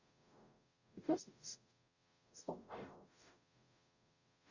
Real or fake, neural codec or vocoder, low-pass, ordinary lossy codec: fake; codec, 44.1 kHz, 0.9 kbps, DAC; 7.2 kHz; none